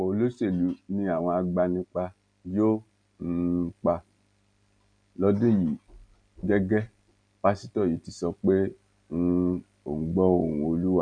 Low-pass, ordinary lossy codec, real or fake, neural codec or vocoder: 9.9 kHz; none; real; none